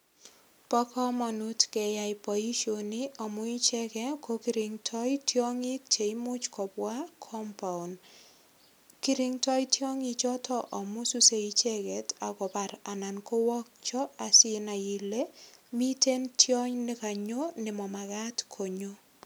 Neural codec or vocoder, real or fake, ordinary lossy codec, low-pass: none; real; none; none